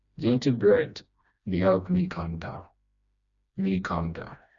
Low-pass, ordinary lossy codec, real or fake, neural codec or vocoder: 7.2 kHz; none; fake; codec, 16 kHz, 1 kbps, FreqCodec, smaller model